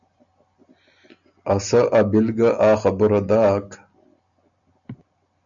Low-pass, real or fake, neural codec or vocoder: 7.2 kHz; real; none